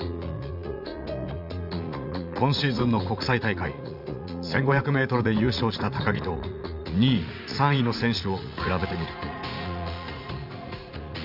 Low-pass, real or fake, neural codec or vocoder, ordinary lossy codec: 5.4 kHz; fake; vocoder, 44.1 kHz, 80 mel bands, Vocos; none